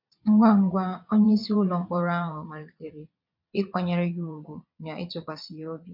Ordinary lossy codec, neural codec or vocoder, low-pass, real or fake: MP3, 48 kbps; vocoder, 22.05 kHz, 80 mel bands, Vocos; 5.4 kHz; fake